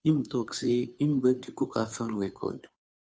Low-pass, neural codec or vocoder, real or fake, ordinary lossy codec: none; codec, 16 kHz, 2 kbps, FunCodec, trained on Chinese and English, 25 frames a second; fake; none